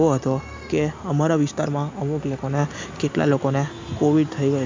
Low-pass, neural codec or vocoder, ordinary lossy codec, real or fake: 7.2 kHz; none; MP3, 64 kbps; real